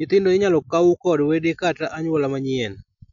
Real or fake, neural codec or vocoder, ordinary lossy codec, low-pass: real; none; none; 7.2 kHz